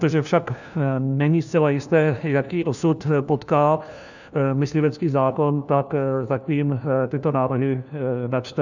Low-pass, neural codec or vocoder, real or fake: 7.2 kHz; codec, 16 kHz, 1 kbps, FunCodec, trained on LibriTTS, 50 frames a second; fake